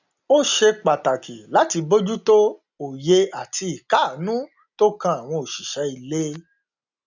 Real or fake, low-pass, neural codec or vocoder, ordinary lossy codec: real; 7.2 kHz; none; none